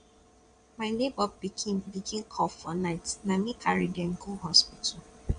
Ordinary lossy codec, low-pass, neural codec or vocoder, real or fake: none; 9.9 kHz; vocoder, 22.05 kHz, 80 mel bands, Vocos; fake